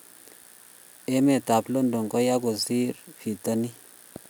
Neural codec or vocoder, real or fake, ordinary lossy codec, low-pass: none; real; none; none